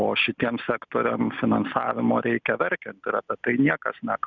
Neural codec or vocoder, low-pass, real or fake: none; 7.2 kHz; real